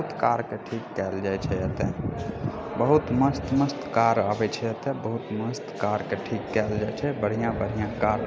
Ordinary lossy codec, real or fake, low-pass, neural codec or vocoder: none; real; none; none